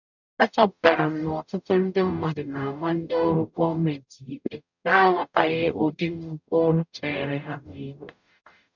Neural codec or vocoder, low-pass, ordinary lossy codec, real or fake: codec, 44.1 kHz, 0.9 kbps, DAC; 7.2 kHz; none; fake